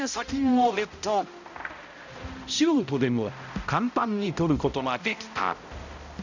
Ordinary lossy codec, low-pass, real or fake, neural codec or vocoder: none; 7.2 kHz; fake; codec, 16 kHz, 0.5 kbps, X-Codec, HuBERT features, trained on balanced general audio